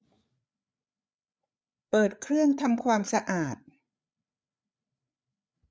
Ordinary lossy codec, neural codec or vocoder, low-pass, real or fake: none; codec, 16 kHz, 8 kbps, FreqCodec, larger model; none; fake